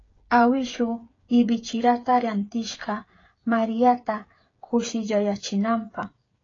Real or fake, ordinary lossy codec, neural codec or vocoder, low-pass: fake; AAC, 32 kbps; codec, 16 kHz, 8 kbps, FreqCodec, smaller model; 7.2 kHz